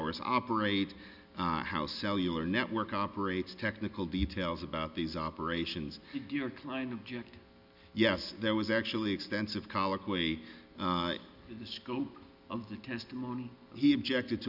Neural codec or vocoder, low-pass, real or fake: none; 5.4 kHz; real